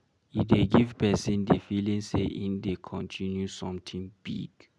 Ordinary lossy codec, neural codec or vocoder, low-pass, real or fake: none; none; none; real